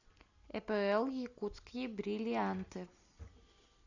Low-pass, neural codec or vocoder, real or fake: 7.2 kHz; none; real